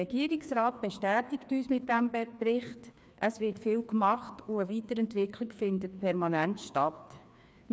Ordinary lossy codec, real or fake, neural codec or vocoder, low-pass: none; fake; codec, 16 kHz, 2 kbps, FreqCodec, larger model; none